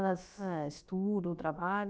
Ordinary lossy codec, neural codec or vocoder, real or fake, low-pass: none; codec, 16 kHz, about 1 kbps, DyCAST, with the encoder's durations; fake; none